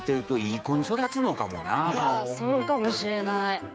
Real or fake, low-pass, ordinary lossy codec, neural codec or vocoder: fake; none; none; codec, 16 kHz, 4 kbps, X-Codec, HuBERT features, trained on general audio